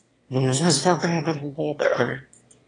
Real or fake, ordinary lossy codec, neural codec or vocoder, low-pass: fake; AAC, 32 kbps; autoencoder, 22.05 kHz, a latent of 192 numbers a frame, VITS, trained on one speaker; 9.9 kHz